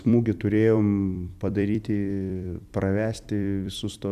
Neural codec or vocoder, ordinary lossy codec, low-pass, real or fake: vocoder, 44.1 kHz, 128 mel bands every 256 samples, BigVGAN v2; MP3, 96 kbps; 14.4 kHz; fake